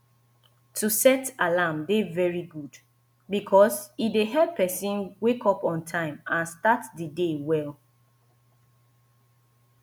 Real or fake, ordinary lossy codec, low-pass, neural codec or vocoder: real; none; none; none